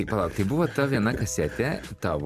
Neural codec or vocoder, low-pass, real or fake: vocoder, 44.1 kHz, 128 mel bands every 256 samples, BigVGAN v2; 14.4 kHz; fake